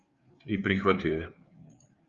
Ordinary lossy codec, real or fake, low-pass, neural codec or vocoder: Opus, 32 kbps; fake; 7.2 kHz; codec, 16 kHz, 4 kbps, FreqCodec, larger model